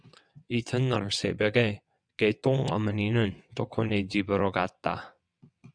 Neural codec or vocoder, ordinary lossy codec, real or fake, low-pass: vocoder, 22.05 kHz, 80 mel bands, WaveNeXt; MP3, 96 kbps; fake; 9.9 kHz